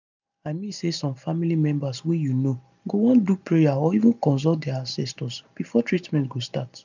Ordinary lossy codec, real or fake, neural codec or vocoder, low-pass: none; real; none; 7.2 kHz